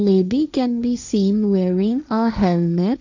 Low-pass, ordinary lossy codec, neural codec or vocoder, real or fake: 7.2 kHz; none; codec, 16 kHz, 1.1 kbps, Voila-Tokenizer; fake